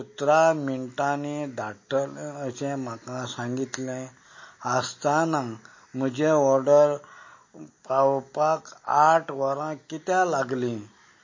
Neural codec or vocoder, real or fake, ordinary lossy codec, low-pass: none; real; MP3, 32 kbps; 7.2 kHz